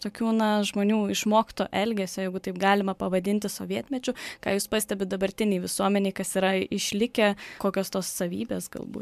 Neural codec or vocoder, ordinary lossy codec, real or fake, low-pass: vocoder, 44.1 kHz, 128 mel bands every 512 samples, BigVGAN v2; MP3, 96 kbps; fake; 14.4 kHz